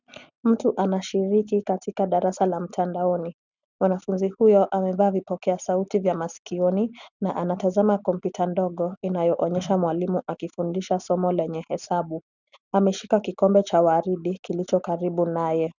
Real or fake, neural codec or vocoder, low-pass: real; none; 7.2 kHz